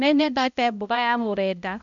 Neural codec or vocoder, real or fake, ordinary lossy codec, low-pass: codec, 16 kHz, 0.5 kbps, X-Codec, HuBERT features, trained on balanced general audio; fake; none; 7.2 kHz